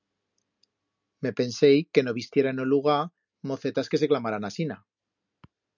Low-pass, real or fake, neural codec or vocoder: 7.2 kHz; real; none